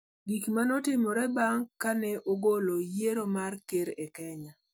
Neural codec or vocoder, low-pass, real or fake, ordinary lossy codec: none; none; real; none